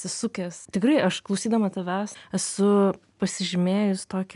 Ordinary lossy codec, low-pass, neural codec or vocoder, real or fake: MP3, 96 kbps; 10.8 kHz; none; real